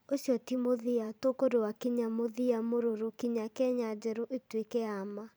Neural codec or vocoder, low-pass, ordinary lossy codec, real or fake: vocoder, 44.1 kHz, 128 mel bands every 512 samples, BigVGAN v2; none; none; fake